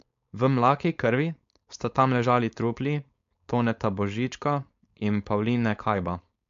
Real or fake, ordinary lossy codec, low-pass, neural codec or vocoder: fake; MP3, 48 kbps; 7.2 kHz; codec, 16 kHz, 4.8 kbps, FACodec